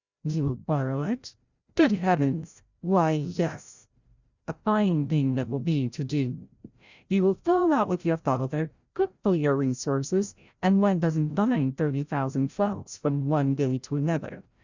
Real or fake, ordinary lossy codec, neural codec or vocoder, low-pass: fake; Opus, 64 kbps; codec, 16 kHz, 0.5 kbps, FreqCodec, larger model; 7.2 kHz